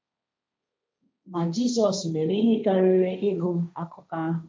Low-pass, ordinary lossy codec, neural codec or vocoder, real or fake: none; none; codec, 16 kHz, 1.1 kbps, Voila-Tokenizer; fake